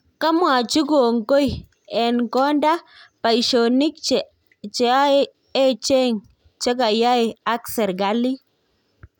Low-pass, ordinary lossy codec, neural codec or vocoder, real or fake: 19.8 kHz; none; none; real